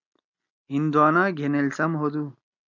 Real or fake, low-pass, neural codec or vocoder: real; 7.2 kHz; none